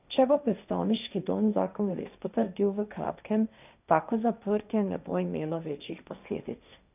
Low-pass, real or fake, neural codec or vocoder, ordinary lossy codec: 3.6 kHz; fake; codec, 16 kHz, 1.1 kbps, Voila-Tokenizer; none